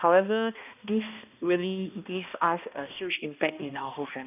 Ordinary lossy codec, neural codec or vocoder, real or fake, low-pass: none; codec, 16 kHz, 1 kbps, X-Codec, HuBERT features, trained on balanced general audio; fake; 3.6 kHz